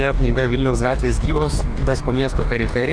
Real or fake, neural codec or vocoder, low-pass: fake; codec, 44.1 kHz, 2.6 kbps, DAC; 9.9 kHz